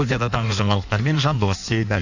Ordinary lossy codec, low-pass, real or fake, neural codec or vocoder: none; 7.2 kHz; fake; codec, 16 kHz in and 24 kHz out, 1.1 kbps, FireRedTTS-2 codec